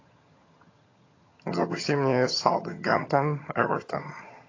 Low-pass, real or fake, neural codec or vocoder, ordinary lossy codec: 7.2 kHz; fake; vocoder, 22.05 kHz, 80 mel bands, HiFi-GAN; AAC, 32 kbps